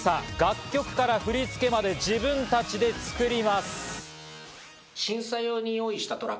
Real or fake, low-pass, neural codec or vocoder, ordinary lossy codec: real; none; none; none